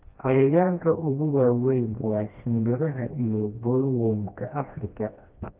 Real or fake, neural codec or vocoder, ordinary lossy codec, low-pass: fake; codec, 16 kHz, 1 kbps, FreqCodec, smaller model; Opus, 24 kbps; 3.6 kHz